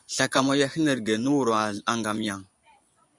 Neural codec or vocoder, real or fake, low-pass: vocoder, 24 kHz, 100 mel bands, Vocos; fake; 10.8 kHz